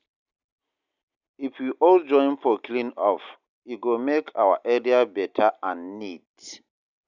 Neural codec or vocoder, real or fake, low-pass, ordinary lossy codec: none; real; 7.2 kHz; none